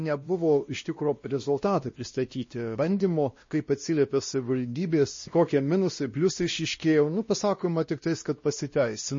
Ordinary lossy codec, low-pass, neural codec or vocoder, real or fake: MP3, 32 kbps; 7.2 kHz; codec, 16 kHz, 1 kbps, X-Codec, WavLM features, trained on Multilingual LibriSpeech; fake